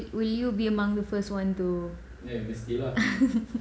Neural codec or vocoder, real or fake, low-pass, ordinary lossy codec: none; real; none; none